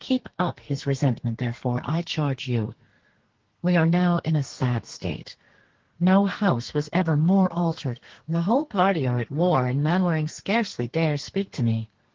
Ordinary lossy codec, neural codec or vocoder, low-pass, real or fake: Opus, 16 kbps; codec, 32 kHz, 1.9 kbps, SNAC; 7.2 kHz; fake